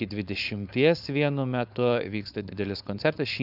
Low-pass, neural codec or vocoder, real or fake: 5.4 kHz; codec, 16 kHz, 4.8 kbps, FACodec; fake